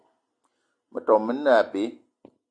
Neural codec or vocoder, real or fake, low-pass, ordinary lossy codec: none; real; 9.9 kHz; AAC, 64 kbps